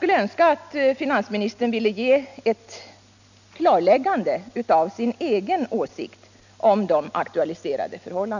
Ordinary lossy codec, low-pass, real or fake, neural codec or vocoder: none; 7.2 kHz; real; none